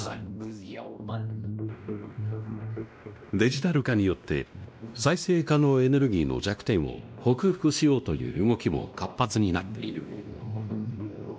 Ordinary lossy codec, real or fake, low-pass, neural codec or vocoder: none; fake; none; codec, 16 kHz, 1 kbps, X-Codec, WavLM features, trained on Multilingual LibriSpeech